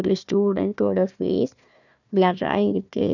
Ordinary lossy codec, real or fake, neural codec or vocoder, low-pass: none; fake; codec, 16 kHz, 1 kbps, FunCodec, trained on Chinese and English, 50 frames a second; 7.2 kHz